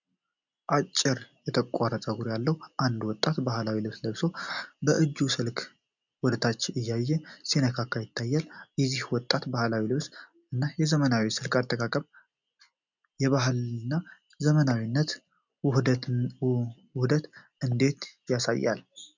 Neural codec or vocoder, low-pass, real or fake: none; 7.2 kHz; real